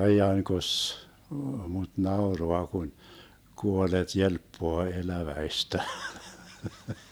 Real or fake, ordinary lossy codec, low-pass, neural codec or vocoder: fake; none; none; vocoder, 44.1 kHz, 128 mel bands every 512 samples, BigVGAN v2